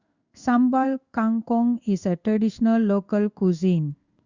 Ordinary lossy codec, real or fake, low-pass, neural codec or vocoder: Opus, 64 kbps; fake; 7.2 kHz; codec, 16 kHz in and 24 kHz out, 1 kbps, XY-Tokenizer